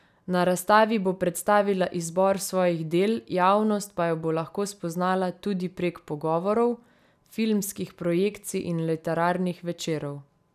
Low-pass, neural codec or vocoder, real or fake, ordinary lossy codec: 14.4 kHz; none; real; none